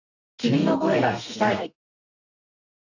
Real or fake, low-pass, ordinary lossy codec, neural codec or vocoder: fake; 7.2 kHz; none; codec, 32 kHz, 1.9 kbps, SNAC